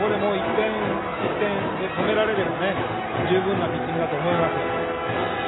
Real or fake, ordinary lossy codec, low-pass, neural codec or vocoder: real; AAC, 16 kbps; 7.2 kHz; none